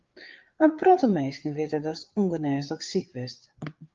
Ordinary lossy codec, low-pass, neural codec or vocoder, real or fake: Opus, 32 kbps; 7.2 kHz; codec, 16 kHz, 4 kbps, FreqCodec, larger model; fake